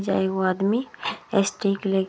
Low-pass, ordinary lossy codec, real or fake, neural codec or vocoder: none; none; real; none